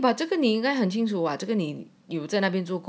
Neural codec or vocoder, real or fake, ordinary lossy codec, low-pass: none; real; none; none